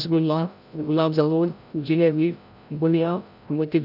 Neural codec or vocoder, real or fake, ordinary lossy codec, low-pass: codec, 16 kHz, 0.5 kbps, FreqCodec, larger model; fake; AAC, 48 kbps; 5.4 kHz